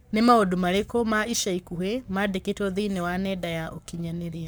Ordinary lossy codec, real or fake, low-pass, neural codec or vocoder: none; fake; none; codec, 44.1 kHz, 7.8 kbps, Pupu-Codec